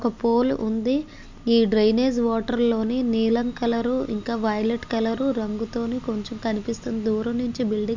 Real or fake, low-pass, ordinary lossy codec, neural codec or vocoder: real; 7.2 kHz; MP3, 64 kbps; none